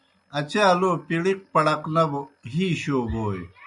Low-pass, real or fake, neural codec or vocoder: 10.8 kHz; real; none